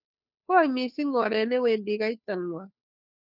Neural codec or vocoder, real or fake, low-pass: codec, 16 kHz, 2 kbps, FunCodec, trained on Chinese and English, 25 frames a second; fake; 5.4 kHz